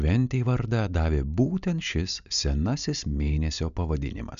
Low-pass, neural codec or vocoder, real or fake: 7.2 kHz; none; real